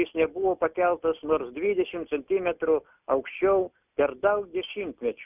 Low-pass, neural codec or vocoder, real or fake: 3.6 kHz; none; real